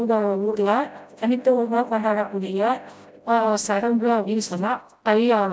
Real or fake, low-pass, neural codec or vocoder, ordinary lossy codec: fake; none; codec, 16 kHz, 0.5 kbps, FreqCodec, smaller model; none